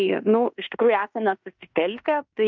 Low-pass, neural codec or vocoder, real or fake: 7.2 kHz; codec, 16 kHz in and 24 kHz out, 0.9 kbps, LongCat-Audio-Codec, fine tuned four codebook decoder; fake